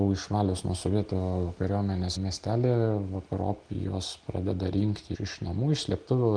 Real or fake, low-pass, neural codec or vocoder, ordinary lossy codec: real; 9.9 kHz; none; Opus, 24 kbps